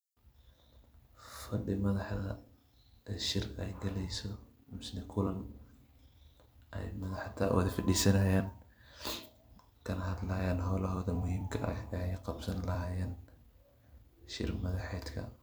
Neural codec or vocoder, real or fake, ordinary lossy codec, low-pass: vocoder, 44.1 kHz, 128 mel bands every 256 samples, BigVGAN v2; fake; none; none